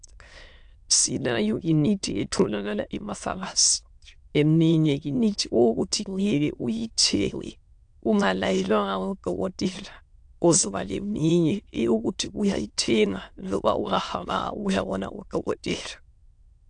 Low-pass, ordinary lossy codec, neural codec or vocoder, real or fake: 9.9 kHz; AAC, 64 kbps; autoencoder, 22.05 kHz, a latent of 192 numbers a frame, VITS, trained on many speakers; fake